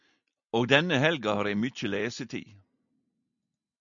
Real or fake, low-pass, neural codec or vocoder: real; 7.2 kHz; none